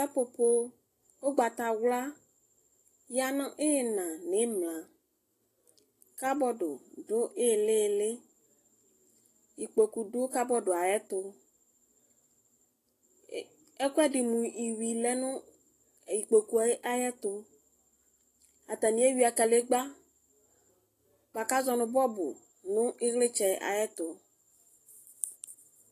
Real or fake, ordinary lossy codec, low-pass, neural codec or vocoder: real; AAC, 48 kbps; 14.4 kHz; none